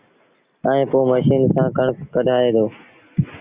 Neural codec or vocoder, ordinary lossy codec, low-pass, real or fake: none; Opus, 64 kbps; 3.6 kHz; real